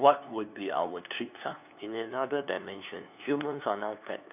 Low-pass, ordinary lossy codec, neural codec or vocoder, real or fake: 3.6 kHz; none; codec, 16 kHz, 2 kbps, FunCodec, trained on LibriTTS, 25 frames a second; fake